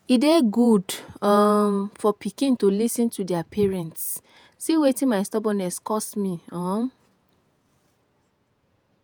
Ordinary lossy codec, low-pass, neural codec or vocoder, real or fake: none; none; vocoder, 48 kHz, 128 mel bands, Vocos; fake